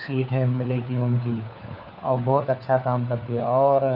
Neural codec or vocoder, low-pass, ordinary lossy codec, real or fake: codec, 16 kHz, 4 kbps, FunCodec, trained on LibriTTS, 50 frames a second; 5.4 kHz; none; fake